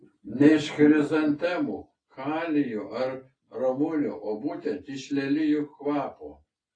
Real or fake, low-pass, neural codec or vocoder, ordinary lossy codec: real; 9.9 kHz; none; AAC, 32 kbps